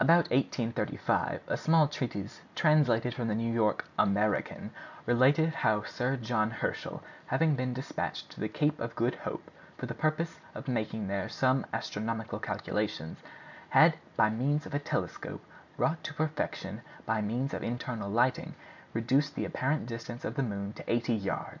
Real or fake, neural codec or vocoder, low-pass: real; none; 7.2 kHz